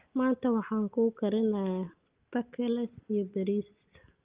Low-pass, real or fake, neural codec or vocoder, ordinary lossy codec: 3.6 kHz; real; none; Opus, 32 kbps